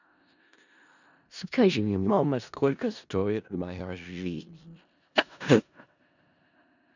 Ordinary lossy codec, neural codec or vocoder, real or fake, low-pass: none; codec, 16 kHz in and 24 kHz out, 0.4 kbps, LongCat-Audio-Codec, four codebook decoder; fake; 7.2 kHz